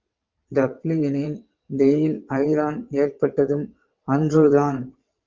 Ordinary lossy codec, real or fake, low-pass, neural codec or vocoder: Opus, 24 kbps; fake; 7.2 kHz; vocoder, 22.05 kHz, 80 mel bands, WaveNeXt